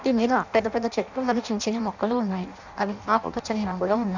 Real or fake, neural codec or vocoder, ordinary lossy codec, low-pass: fake; codec, 16 kHz in and 24 kHz out, 0.6 kbps, FireRedTTS-2 codec; none; 7.2 kHz